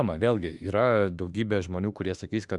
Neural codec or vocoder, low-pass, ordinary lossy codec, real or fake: autoencoder, 48 kHz, 32 numbers a frame, DAC-VAE, trained on Japanese speech; 10.8 kHz; Opus, 64 kbps; fake